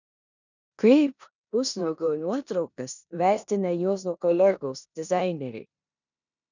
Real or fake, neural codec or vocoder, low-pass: fake; codec, 16 kHz in and 24 kHz out, 0.9 kbps, LongCat-Audio-Codec, four codebook decoder; 7.2 kHz